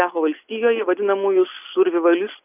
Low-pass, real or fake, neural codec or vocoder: 3.6 kHz; real; none